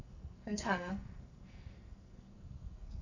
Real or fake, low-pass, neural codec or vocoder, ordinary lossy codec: fake; 7.2 kHz; codec, 44.1 kHz, 2.6 kbps, SNAC; none